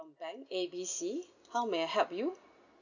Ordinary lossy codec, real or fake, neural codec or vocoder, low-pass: none; real; none; 7.2 kHz